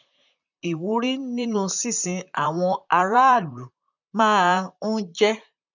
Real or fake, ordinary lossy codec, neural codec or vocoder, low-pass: fake; none; vocoder, 44.1 kHz, 128 mel bands, Pupu-Vocoder; 7.2 kHz